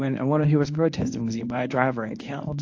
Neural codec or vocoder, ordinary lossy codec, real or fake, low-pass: codec, 24 kHz, 0.9 kbps, WavTokenizer, medium speech release version 1; AAC, 48 kbps; fake; 7.2 kHz